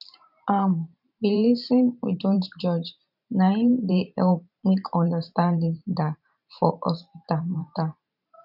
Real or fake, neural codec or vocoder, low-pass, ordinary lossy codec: fake; vocoder, 44.1 kHz, 128 mel bands every 512 samples, BigVGAN v2; 5.4 kHz; none